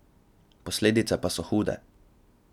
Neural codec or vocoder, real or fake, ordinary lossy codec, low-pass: none; real; none; 19.8 kHz